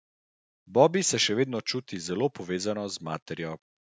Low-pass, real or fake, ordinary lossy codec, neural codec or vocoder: none; real; none; none